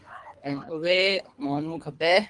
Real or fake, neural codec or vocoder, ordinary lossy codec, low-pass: fake; codec, 24 kHz, 3 kbps, HILCodec; Opus, 32 kbps; 10.8 kHz